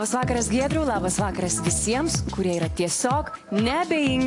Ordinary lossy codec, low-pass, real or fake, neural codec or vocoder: AAC, 48 kbps; 10.8 kHz; real; none